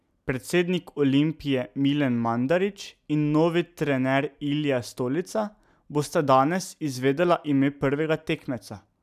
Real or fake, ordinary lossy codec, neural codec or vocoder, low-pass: real; none; none; 14.4 kHz